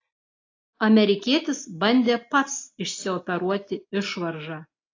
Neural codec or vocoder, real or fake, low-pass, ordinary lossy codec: none; real; 7.2 kHz; AAC, 32 kbps